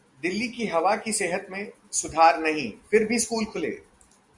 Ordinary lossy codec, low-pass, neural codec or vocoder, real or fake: Opus, 64 kbps; 10.8 kHz; none; real